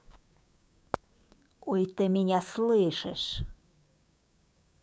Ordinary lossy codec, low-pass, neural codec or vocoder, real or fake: none; none; codec, 16 kHz, 6 kbps, DAC; fake